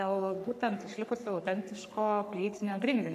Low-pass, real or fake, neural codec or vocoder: 14.4 kHz; fake; codec, 44.1 kHz, 3.4 kbps, Pupu-Codec